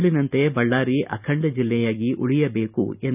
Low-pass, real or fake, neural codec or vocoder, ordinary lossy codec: 3.6 kHz; real; none; none